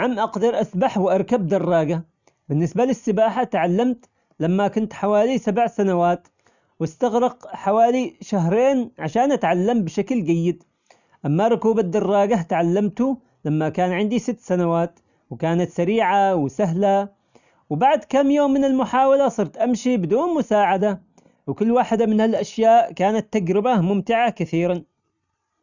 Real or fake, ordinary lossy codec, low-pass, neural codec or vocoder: real; none; 7.2 kHz; none